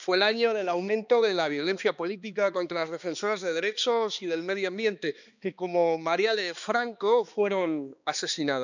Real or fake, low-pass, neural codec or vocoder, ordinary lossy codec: fake; 7.2 kHz; codec, 16 kHz, 2 kbps, X-Codec, HuBERT features, trained on balanced general audio; none